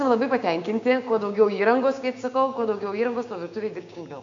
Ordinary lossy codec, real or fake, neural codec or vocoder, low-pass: AAC, 64 kbps; fake; codec, 16 kHz, 6 kbps, DAC; 7.2 kHz